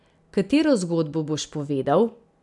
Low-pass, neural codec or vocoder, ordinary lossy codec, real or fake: 10.8 kHz; none; none; real